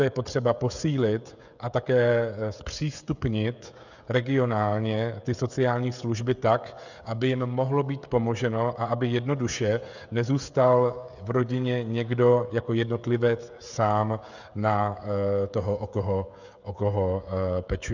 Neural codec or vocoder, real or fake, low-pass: codec, 16 kHz, 16 kbps, FreqCodec, smaller model; fake; 7.2 kHz